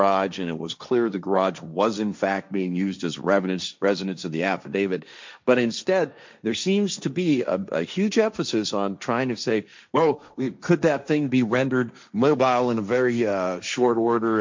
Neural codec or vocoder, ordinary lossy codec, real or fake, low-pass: codec, 16 kHz, 1.1 kbps, Voila-Tokenizer; MP3, 64 kbps; fake; 7.2 kHz